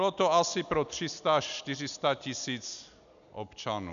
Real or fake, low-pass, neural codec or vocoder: real; 7.2 kHz; none